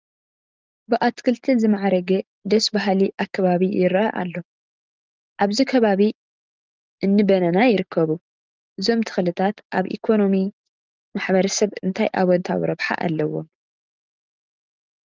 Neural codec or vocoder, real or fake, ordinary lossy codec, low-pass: none; real; Opus, 32 kbps; 7.2 kHz